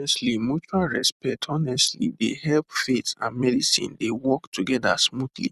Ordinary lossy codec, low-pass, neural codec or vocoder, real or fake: none; 14.4 kHz; vocoder, 44.1 kHz, 128 mel bands every 256 samples, BigVGAN v2; fake